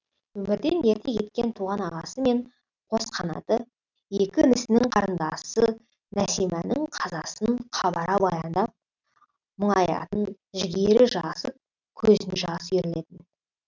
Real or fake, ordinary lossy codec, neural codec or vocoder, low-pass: real; none; none; 7.2 kHz